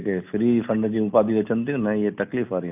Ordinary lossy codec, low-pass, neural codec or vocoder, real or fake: AAC, 32 kbps; 3.6 kHz; none; real